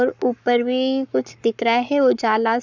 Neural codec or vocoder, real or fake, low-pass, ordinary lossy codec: codec, 44.1 kHz, 7.8 kbps, Pupu-Codec; fake; 7.2 kHz; none